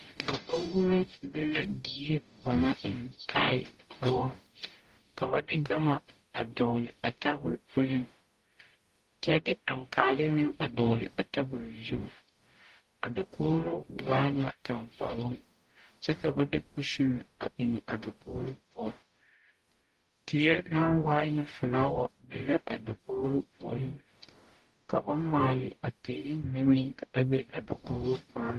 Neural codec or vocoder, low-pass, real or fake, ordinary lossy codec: codec, 44.1 kHz, 0.9 kbps, DAC; 14.4 kHz; fake; Opus, 24 kbps